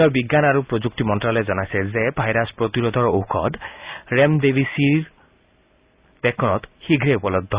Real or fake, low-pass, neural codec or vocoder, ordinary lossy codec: real; 3.6 kHz; none; Opus, 64 kbps